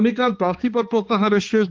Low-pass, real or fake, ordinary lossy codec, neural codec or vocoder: 7.2 kHz; fake; Opus, 32 kbps; codec, 16 kHz, 2 kbps, X-Codec, HuBERT features, trained on balanced general audio